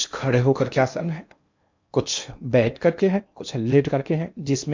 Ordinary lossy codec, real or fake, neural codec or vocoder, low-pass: MP3, 64 kbps; fake; codec, 16 kHz in and 24 kHz out, 0.8 kbps, FocalCodec, streaming, 65536 codes; 7.2 kHz